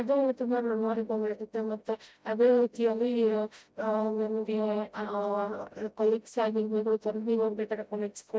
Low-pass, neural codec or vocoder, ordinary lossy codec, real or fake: none; codec, 16 kHz, 0.5 kbps, FreqCodec, smaller model; none; fake